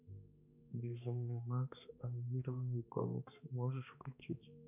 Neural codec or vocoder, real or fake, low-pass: codec, 16 kHz, 2 kbps, X-Codec, HuBERT features, trained on balanced general audio; fake; 3.6 kHz